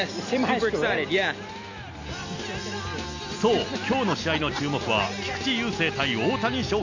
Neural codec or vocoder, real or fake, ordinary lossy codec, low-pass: none; real; AAC, 48 kbps; 7.2 kHz